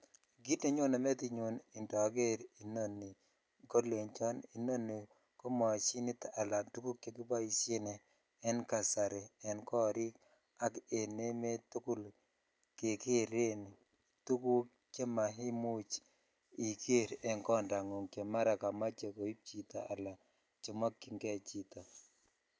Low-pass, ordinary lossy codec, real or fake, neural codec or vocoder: none; none; real; none